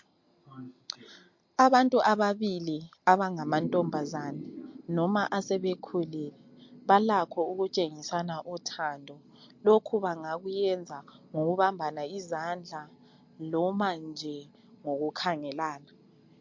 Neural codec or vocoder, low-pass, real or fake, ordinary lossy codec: none; 7.2 kHz; real; MP3, 48 kbps